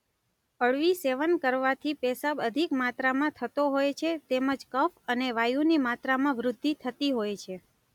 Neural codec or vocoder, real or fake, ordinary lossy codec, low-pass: none; real; none; 19.8 kHz